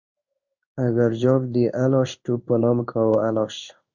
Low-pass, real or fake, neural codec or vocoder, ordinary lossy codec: 7.2 kHz; fake; codec, 16 kHz in and 24 kHz out, 1 kbps, XY-Tokenizer; Opus, 64 kbps